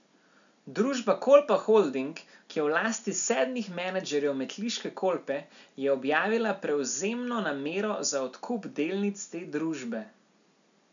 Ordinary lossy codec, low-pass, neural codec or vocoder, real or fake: none; 7.2 kHz; none; real